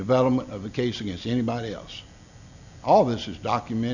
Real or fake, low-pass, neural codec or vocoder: real; 7.2 kHz; none